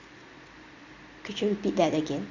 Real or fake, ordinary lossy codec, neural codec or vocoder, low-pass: real; Opus, 64 kbps; none; 7.2 kHz